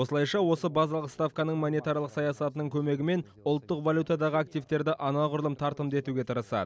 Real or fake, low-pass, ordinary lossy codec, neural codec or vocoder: real; none; none; none